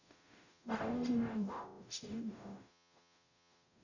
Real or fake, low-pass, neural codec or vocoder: fake; 7.2 kHz; codec, 44.1 kHz, 0.9 kbps, DAC